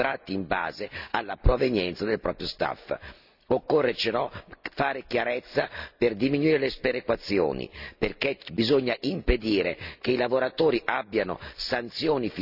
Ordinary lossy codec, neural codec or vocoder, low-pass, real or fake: none; none; 5.4 kHz; real